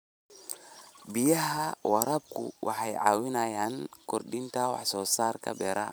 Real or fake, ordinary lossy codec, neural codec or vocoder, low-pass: fake; none; vocoder, 44.1 kHz, 128 mel bands every 512 samples, BigVGAN v2; none